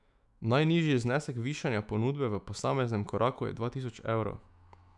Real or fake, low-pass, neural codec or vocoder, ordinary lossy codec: fake; 10.8 kHz; autoencoder, 48 kHz, 128 numbers a frame, DAC-VAE, trained on Japanese speech; none